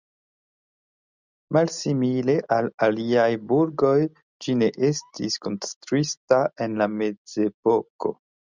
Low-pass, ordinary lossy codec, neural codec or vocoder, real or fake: 7.2 kHz; Opus, 64 kbps; none; real